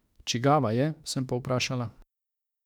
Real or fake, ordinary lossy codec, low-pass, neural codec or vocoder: fake; none; 19.8 kHz; autoencoder, 48 kHz, 32 numbers a frame, DAC-VAE, trained on Japanese speech